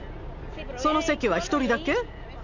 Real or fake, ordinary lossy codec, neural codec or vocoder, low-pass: real; none; none; 7.2 kHz